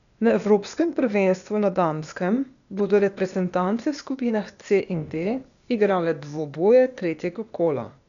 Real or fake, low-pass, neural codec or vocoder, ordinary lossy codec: fake; 7.2 kHz; codec, 16 kHz, 0.8 kbps, ZipCodec; none